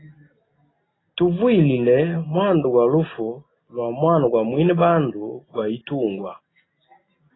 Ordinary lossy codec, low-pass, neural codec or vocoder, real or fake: AAC, 16 kbps; 7.2 kHz; none; real